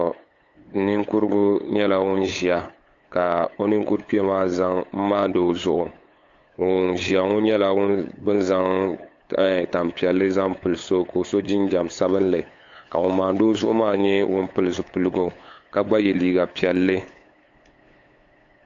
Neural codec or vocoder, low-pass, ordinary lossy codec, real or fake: codec, 16 kHz, 8 kbps, FunCodec, trained on LibriTTS, 25 frames a second; 7.2 kHz; AAC, 48 kbps; fake